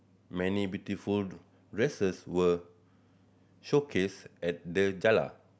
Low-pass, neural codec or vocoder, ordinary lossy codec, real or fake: none; none; none; real